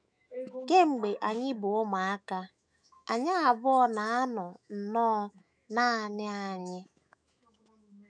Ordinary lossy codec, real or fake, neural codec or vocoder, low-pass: none; fake; autoencoder, 48 kHz, 128 numbers a frame, DAC-VAE, trained on Japanese speech; 9.9 kHz